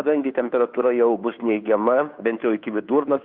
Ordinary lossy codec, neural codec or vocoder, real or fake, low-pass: Opus, 64 kbps; codec, 16 kHz, 2 kbps, FunCodec, trained on Chinese and English, 25 frames a second; fake; 5.4 kHz